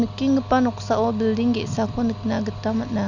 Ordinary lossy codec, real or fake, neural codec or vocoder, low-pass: none; real; none; 7.2 kHz